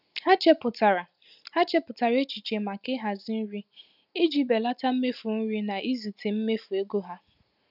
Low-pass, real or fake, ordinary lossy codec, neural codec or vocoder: 5.4 kHz; real; none; none